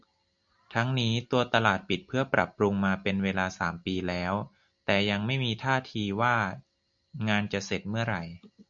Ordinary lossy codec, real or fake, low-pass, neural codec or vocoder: MP3, 64 kbps; real; 7.2 kHz; none